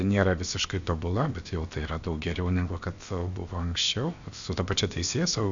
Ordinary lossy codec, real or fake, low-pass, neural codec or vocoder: Opus, 64 kbps; fake; 7.2 kHz; codec, 16 kHz, about 1 kbps, DyCAST, with the encoder's durations